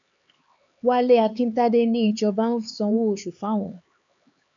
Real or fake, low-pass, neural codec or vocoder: fake; 7.2 kHz; codec, 16 kHz, 4 kbps, X-Codec, HuBERT features, trained on LibriSpeech